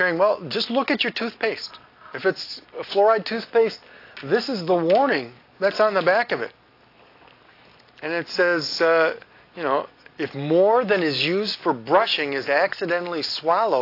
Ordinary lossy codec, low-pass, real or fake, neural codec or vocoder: AAC, 32 kbps; 5.4 kHz; real; none